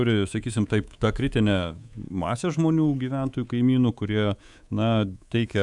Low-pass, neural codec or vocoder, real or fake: 10.8 kHz; codec, 24 kHz, 3.1 kbps, DualCodec; fake